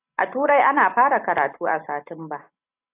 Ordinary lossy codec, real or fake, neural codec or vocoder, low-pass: AAC, 24 kbps; real; none; 3.6 kHz